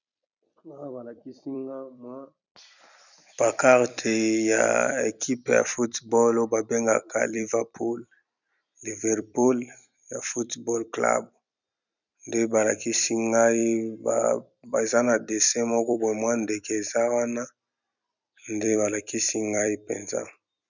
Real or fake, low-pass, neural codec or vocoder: fake; 7.2 kHz; vocoder, 44.1 kHz, 128 mel bands every 256 samples, BigVGAN v2